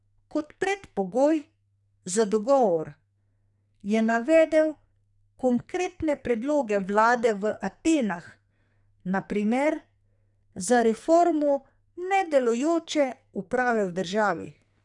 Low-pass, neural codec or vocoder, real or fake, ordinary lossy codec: 10.8 kHz; codec, 44.1 kHz, 2.6 kbps, SNAC; fake; none